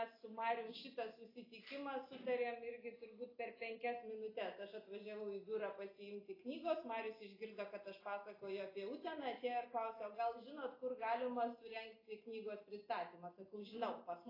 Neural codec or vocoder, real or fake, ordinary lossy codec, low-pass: none; real; AAC, 24 kbps; 5.4 kHz